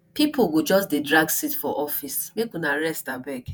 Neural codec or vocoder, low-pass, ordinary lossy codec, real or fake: vocoder, 48 kHz, 128 mel bands, Vocos; none; none; fake